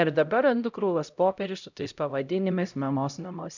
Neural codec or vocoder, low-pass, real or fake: codec, 16 kHz, 0.5 kbps, X-Codec, HuBERT features, trained on LibriSpeech; 7.2 kHz; fake